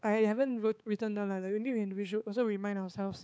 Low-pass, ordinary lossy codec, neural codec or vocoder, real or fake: none; none; codec, 16 kHz, 4 kbps, X-Codec, HuBERT features, trained on balanced general audio; fake